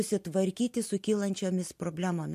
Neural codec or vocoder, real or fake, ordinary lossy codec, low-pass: none; real; MP3, 64 kbps; 14.4 kHz